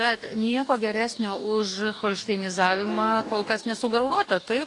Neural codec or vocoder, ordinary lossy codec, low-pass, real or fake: codec, 44.1 kHz, 2.6 kbps, DAC; AAC, 48 kbps; 10.8 kHz; fake